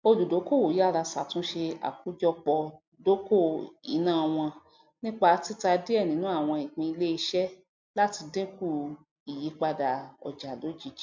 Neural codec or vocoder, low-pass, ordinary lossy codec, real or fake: none; 7.2 kHz; MP3, 64 kbps; real